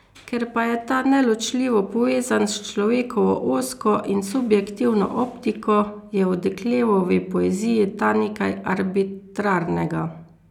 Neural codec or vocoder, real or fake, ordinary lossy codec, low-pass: none; real; none; 19.8 kHz